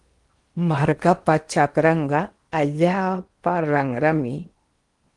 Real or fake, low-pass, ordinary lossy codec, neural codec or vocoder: fake; 10.8 kHz; Opus, 24 kbps; codec, 16 kHz in and 24 kHz out, 0.8 kbps, FocalCodec, streaming, 65536 codes